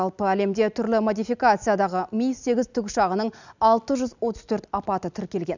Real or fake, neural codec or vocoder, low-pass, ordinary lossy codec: real; none; 7.2 kHz; none